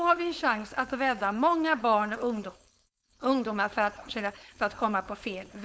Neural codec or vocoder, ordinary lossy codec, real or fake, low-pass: codec, 16 kHz, 4.8 kbps, FACodec; none; fake; none